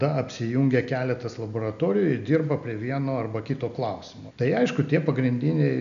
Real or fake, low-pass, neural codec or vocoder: real; 7.2 kHz; none